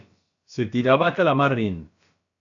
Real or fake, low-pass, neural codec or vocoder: fake; 7.2 kHz; codec, 16 kHz, about 1 kbps, DyCAST, with the encoder's durations